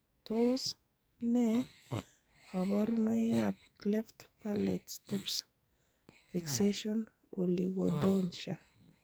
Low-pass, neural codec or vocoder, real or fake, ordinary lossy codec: none; codec, 44.1 kHz, 2.6 kbps, SNAC; fake; none